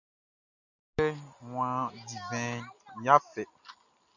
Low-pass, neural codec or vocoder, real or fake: 7.2 kHz; none; real